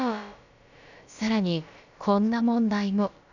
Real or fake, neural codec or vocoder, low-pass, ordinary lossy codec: fake; codec, 16 kHz, about 1 kbps, DyCAST, with the encoder's durations; 7.2 kHz; none